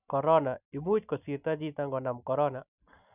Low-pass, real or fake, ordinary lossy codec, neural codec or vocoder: 3.6 kHz; real; none; none